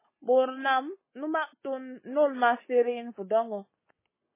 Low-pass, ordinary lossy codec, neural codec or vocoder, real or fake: 3.6 kHz; MP3, 16 kbps; codec, 44.1 kHz, 7.8 kbps, Pupu-Codec; fake